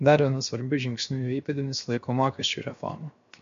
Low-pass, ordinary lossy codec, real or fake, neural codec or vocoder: 7.2 kHz; MP3, 48 kbps; fake; codec, 16 kHz, 0.7 kbps, FocalCodec